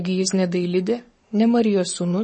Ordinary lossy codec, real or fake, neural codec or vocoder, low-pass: MP3, 32 kbps; fake; codec, 44.1 kHz, 7.8 kbps, Pupu-Codec; 10.8 kHz